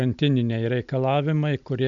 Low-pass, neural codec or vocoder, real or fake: 7.2 kHz; none; real